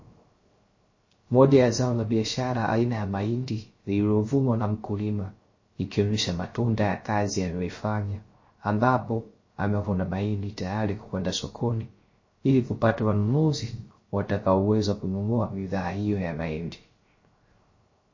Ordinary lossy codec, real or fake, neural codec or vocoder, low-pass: MP3, 32 kbps; fake; codec, 16 kHz, 0.3 kbps, FocalCodec; 7.2 kHz